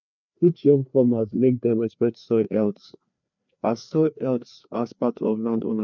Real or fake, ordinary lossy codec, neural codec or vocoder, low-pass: fake; none; codec, 16 kHz, 2 kbps, FreqCodec, larger model; 7.2 kHz